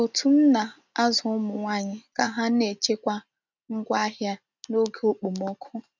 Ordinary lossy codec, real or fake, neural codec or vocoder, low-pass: none; real; none; 7.2 kHz